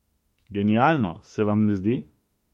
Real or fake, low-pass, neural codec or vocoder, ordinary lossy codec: fake; 19.8 kHz; autoencoder, 48 kHz, 32 numbers a frame, DAC-VAE, trained on Japanese speech; MP3, 64 kbps